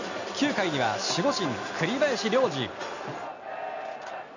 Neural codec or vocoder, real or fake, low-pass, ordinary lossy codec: none; real; 7.2 kHz; none